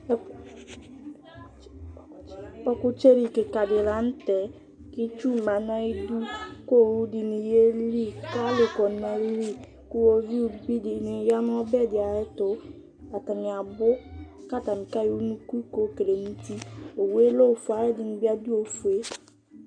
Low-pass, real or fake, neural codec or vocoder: 9.9 kHz; real; none